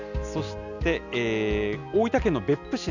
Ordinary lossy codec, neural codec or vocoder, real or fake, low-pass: none; none; real; 7.2 kHz